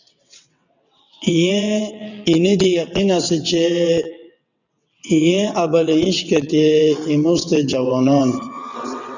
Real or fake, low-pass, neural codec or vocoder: fake; 7.2 kHz; vocoder, 22.05 kHz, 80 mel bands, WaveNeXt